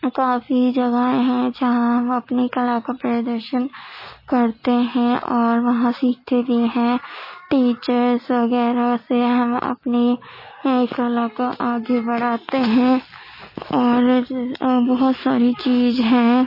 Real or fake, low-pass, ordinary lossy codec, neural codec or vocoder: real; 5.4 kHz; MP3, 24 kbps; none